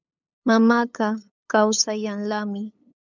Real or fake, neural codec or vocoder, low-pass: fake; codec, 16 kHz, 8 kbps, FunCodec, trained on LibriTTS, 25 frames a second; 7.2 kHz